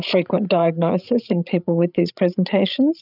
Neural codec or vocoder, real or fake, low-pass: codec, 16 kHz, 16 kbps, FreqCodec, larger model; fake; 5.4 kHz